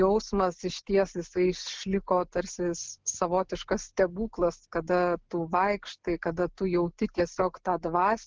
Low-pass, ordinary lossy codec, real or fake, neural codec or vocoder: 7.2 kHz; Opus, 16 kbps; real; none